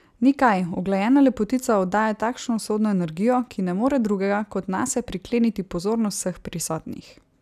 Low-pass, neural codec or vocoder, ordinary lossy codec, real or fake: 14.4 kHz; none; none; real